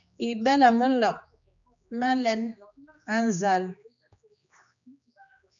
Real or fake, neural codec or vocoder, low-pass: fake; codec, 16 kHz, 2 kbps, X-Codec, HuBERT features, trained on general audio; 7.2 kHz